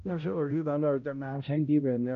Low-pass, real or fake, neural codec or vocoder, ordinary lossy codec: 7.2 kHz; fake; codec, 16 kHz, 0.5 kbps, X-Codec, HuBERT features, trained on balanced general audio; none